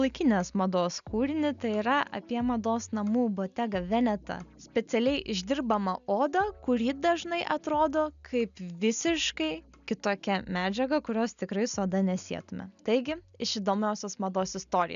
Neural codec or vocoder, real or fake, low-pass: none; real; 7.2 kHz